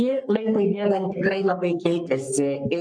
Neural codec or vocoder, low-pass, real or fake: codec, 44.1 kHz, 3.4 kbps, Pupu-Codec; 9.9 kHz; fake